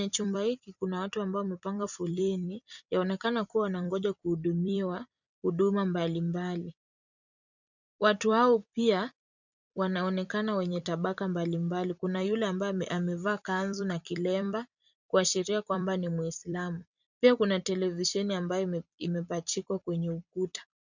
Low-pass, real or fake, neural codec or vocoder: 7.2 kHz; fake; vocoder, 44.1 kHz, 128 mel bands every 256 samples, BigVGAN v2